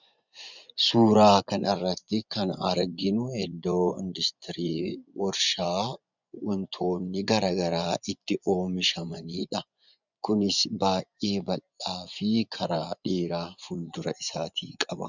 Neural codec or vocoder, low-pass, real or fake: vocoder, 24 kHz, 100 mel bands, Vocos; 7.2 kHz; fake